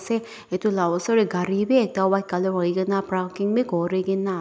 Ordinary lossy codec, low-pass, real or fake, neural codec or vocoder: none; none; real; none